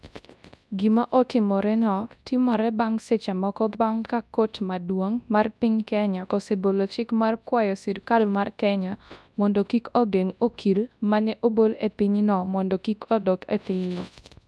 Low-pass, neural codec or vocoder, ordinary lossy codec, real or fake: none; codec, 24 kHz, 0.9 kbps, WavTokenizer, large speech release; none; fake